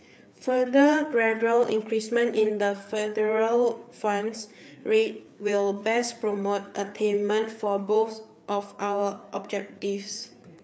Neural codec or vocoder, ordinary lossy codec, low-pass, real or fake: codec, 16 kHz, 4 kbps, FreqCodec, larger model; none; none; fake